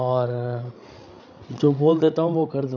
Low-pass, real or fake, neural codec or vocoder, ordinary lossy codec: 7.2 kHz; fake; codec, 16 kHz, 16 kbps, FreqCodec, larger model; none